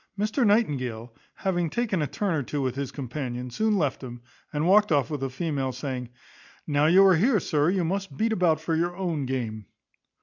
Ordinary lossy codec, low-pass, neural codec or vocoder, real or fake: MP3, 64 kbps; 7.2 kHz; none; real